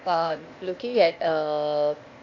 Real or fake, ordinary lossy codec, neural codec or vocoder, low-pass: fake; AAC, 48 kbps; codec, 16 kHz, 0.8 kbps, ZipCodec; 7.2 kHz